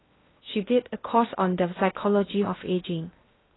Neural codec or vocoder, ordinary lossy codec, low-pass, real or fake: codec, 16 kHz in and 24 kHz out, 0.6 kbps, FocalCodec, streaming, 2048 codes; AAC, 16 kbps; 7.2 kHz; fake